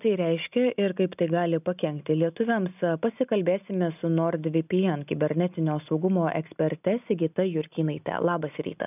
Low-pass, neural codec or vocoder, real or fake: 3.6 kHz; none; real